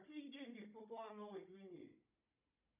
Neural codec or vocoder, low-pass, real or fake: codec, 16 kHz, 16 kbps, FunCodec, trained on Chinese and English, 50 frames a second; 3.6 kHz; fake